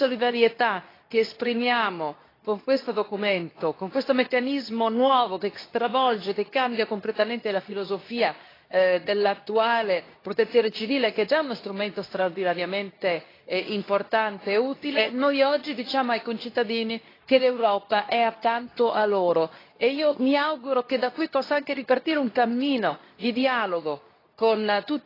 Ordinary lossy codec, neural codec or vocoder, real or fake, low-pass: AAC, 24 kbps; codec, 24 kHz, 0.9 kbps, WavTokenizer, medium speech release version 2; fake; 5.4 kHz